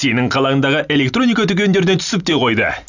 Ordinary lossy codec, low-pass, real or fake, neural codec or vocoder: none; 7.2 kHz; real; none